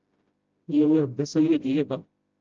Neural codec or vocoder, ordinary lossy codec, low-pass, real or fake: codec, 16 kHz, 0.5 kbps, FreqCodec, smaller model; Opus, 24 kbps; 7.2 kHz; fake